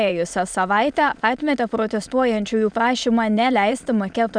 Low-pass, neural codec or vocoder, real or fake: 9.9 kHz; autoencoder, 22.05 kHz, a latent of 192 numbers a frame, VITS, trained on many speakers; fake